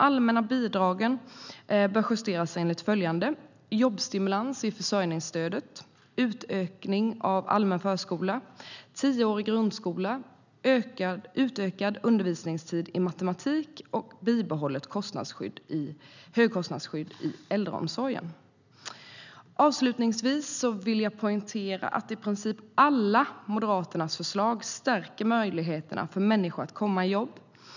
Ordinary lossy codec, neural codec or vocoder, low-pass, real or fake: none; none; 7.2 kHz; real